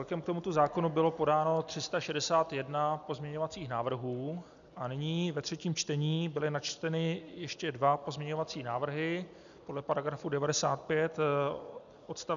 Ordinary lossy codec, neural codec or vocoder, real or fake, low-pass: MP3, 96 kbps; none; real; 7.2 kHz